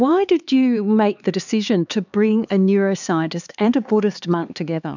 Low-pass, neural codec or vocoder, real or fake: 7.2 kHz; codec, 16 kHz, 4 kbps, X-Codec, HuBERT features, trained on LibriSpeech; fake